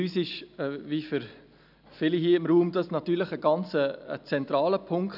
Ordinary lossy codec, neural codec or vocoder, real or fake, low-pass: none; none; real; 5.4 kHz